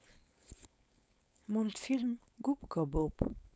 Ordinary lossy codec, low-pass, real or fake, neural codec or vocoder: none; none; fake; codec, 16 kHz, 4.8 kbps, FACodec